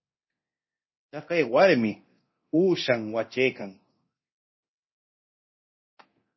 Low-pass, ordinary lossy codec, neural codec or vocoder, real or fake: 7.2 kHz; MP3, 24 kbps; codec, 24 kHz, 0.9 kbps, DualCodec; fake